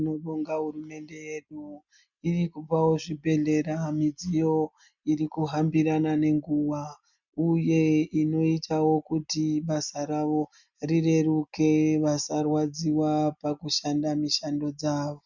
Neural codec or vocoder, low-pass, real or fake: none; 7.2 kHz; real